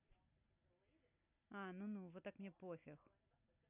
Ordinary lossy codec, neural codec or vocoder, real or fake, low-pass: none; none; real; 3.6 kHz